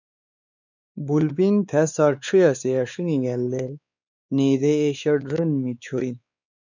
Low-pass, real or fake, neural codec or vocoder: 7.2 kHz; fake; codec, 16 kHz, 4 kbps, X-Codec, WavLM features, trained on Multilingual LibriSpeech